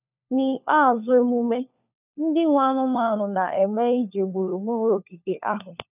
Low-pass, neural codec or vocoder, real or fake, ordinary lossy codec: 3.6 kHz; codec, 16 kHz, 4 kbps, FunCodec, trained on LibriTTS, 50 frames a second; fake; none